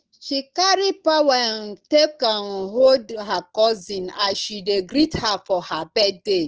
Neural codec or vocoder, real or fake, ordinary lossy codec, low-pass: autoencoder, 48 kHz, 128 numbers a frame, DAC-VAE, trained on Japanese speech; fake; Opus, 16 kbps; 7.2 kHz